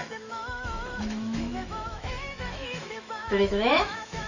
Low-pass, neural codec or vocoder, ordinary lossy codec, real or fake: 7.2 kHz; autoencoder, 48 kHz, 128 numbers a frame, DAC-VAE, trained on Japanese speech; none; fake